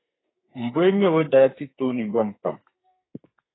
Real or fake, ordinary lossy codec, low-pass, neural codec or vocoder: fake; AAC, 16 kbps; 7.2 kHz; codec, 32 kHz, 1.9 kbps, SNAC